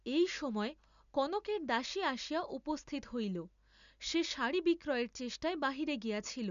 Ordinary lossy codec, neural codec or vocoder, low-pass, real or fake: none; none; 7.2 kHz; real